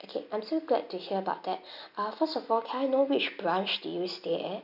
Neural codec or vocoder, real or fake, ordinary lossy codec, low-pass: none; real; none; 5.4 kHz